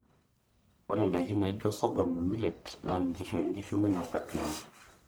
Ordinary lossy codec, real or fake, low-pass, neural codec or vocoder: none; fake; none; codec, 44.1 kHz, 1.7 kbps, Pupu-Codec